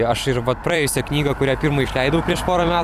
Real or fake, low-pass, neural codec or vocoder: fake; 14.4 kHz; vocoder, 44.1 kHz, 128 mel bands every 256 samples, BigVGAN v2